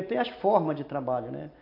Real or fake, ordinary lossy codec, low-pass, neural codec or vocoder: real; AAC, 32 kbps; 5.4 kHz; none